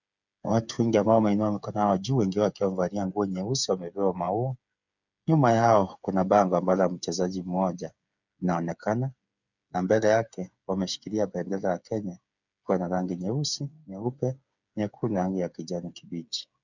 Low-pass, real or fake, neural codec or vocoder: 7.2 kHz; fake; codec, 16 kHz, 8 kbps, FreqCodec, smaller model